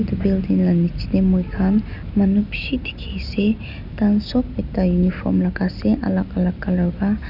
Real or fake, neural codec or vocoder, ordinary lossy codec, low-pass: real; none; none; 5.4 kHz